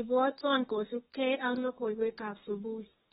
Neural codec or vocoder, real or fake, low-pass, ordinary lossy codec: codec, 32 kHz, 1.9 kbps, SNAC; fake; 14.4 kHz; AAC, 16 kbps